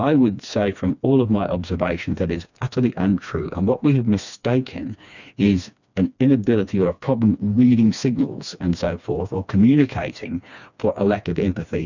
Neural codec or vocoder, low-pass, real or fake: codec, 16 kHz, 2 kbps, FreqCodec, smaller model; 7.2 kHz; fake